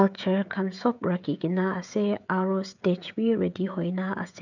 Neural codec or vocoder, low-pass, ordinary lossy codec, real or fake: codec, 16 kHz, 16 kbps, FreqCodec, smaller model; 7.2 kHz; none; fake